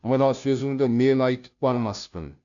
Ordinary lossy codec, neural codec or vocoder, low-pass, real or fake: none; codec, 16 kHz, 0.5 kbps, FunCodec, trained on Chinese and English, 25 frames a second; 7.2 kHz; fake